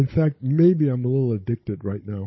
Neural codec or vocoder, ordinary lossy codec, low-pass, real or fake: codec, 16 kHz, 16 kbps, FunCodec, trained on Chinese and English, 50 frames a second; MP3, 24 kbps; 7.2 kHz; fake